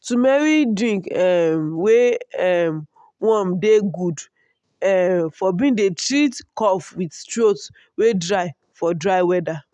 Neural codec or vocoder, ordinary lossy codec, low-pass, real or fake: none; none; 10.8 kHz; real